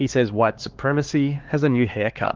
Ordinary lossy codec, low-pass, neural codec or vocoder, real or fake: Opus, 16 kbps; 7.2 kHz; codec, 16 kHz, 2 kbps, X-Codec, HuBERT features, trained on LibriSpeech; fake